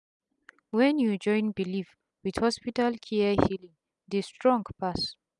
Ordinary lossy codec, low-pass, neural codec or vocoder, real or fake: none; 10.8 kHz; none; real